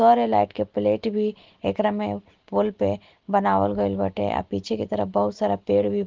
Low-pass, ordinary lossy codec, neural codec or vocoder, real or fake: 7.2 kHz; Opus, 16 kbps; none; real